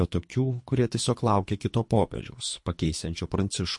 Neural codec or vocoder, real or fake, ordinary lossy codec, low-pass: codec, 24 kHz, 3 kbps, HILCodec; fake; MP3, 48 kbps; 10.8 kHz